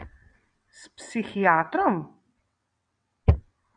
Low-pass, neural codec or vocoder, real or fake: 9.9 kHz; vocoder, 22.05 kHz, 80 mel bands, WaveNeXt; fake